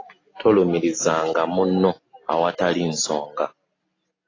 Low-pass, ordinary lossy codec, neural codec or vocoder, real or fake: 7.2 kHz; AAC, 32 kbps; none; real